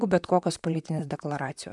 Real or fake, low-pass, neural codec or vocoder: fake; 10.8 kHz; vocoder, 44.1 kHz, 128 mel bands, Pupu-Vocoder